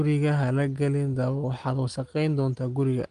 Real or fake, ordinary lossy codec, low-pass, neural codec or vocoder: real; Opus, 24 kbps; 9.9 kHz; none